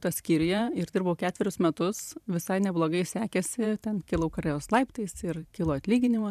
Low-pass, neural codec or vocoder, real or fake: 14.4 kHz; vocoder, 44.1 kHz, 128 mel bands every 512 samples, BigVGAN v2; fake